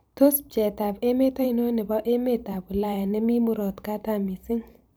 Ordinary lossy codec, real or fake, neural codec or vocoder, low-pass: none; fake; vocoder, 44.1 kHz, 128 mel bands every 512 samples, BigVGAN v2; none